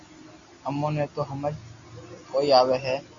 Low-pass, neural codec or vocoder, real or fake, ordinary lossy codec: 7.2 kHz; none; real; Opus, 64 kbps